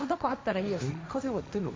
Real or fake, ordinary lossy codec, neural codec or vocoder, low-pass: fake; none; codec, 16 kHz, 1.1 kbps, Voila-Tokenizer; none